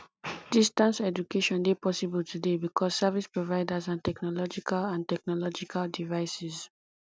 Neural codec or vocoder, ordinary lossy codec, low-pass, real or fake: none; none; none; real